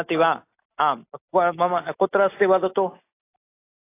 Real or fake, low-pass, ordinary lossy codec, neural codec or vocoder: real; 3.6 kHz; AAC, 24 kbps; none